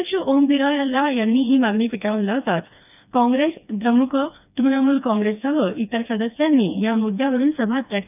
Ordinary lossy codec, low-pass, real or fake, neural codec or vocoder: none; 3.6 kHz; fake; codec, 16 kHz, 2 kbps, FreqCodec, smaller model